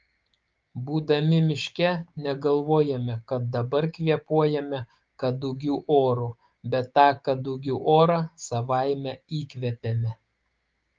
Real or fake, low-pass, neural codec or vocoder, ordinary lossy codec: fake; 7.2 kHz; codec, 16 kHz, 6 kbps, DAC; Opus, 24 kbps